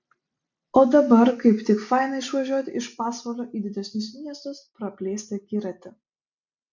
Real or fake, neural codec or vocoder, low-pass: real; none; 7.2 kHz